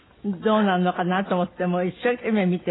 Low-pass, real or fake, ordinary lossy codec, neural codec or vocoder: 7.2 kHz; real; AAC, 16 kbps; none